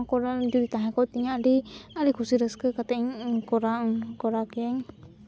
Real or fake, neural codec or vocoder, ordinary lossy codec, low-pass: real; none; none; none